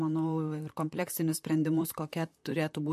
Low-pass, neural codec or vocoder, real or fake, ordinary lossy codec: 14.4 kHz; vocoder, 44.1 kHz, 128 mel bands, Pupu-Vocoder; fake; MP3, 64 kbps